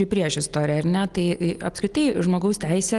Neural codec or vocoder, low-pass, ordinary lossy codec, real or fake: none; 10.8 kHz; Opus, 24 kbps; real